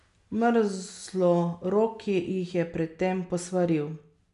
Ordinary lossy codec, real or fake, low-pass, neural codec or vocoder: none; real; 10.8 kHz; none